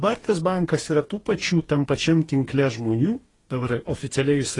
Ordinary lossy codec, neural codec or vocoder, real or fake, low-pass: AAC, 32 kbps; codec, 44.1 kHz, 2.6 kbps, DAC; fake; 10.8 kHz